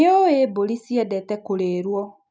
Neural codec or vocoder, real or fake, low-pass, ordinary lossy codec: none; real; none; none